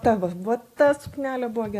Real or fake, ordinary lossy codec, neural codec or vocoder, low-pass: real; AAC, 64 kbps; none; 14.4 kHz